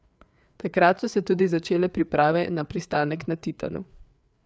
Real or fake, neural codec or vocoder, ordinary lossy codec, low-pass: fake; codec, 16 kHz, 4 kbps, FreqCodec, larger model; none; none